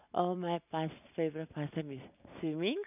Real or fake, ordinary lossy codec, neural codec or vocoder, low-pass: real; none; none; 3.6 kHz